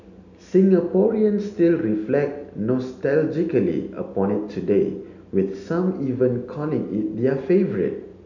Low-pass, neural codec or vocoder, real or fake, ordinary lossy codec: 7.2 kHz; none; real; none